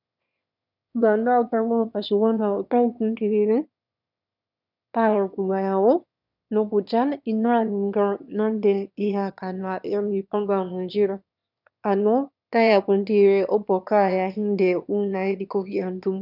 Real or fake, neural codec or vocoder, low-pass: fake; autoencoder, 22.05 kHz, a latent of 192 numbers a frame, VITS, trained on one speaker; 5.4 kHz